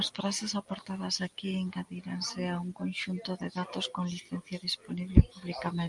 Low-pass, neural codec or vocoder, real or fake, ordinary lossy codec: 10.8 kHz; none; real; Opus, 32 kbps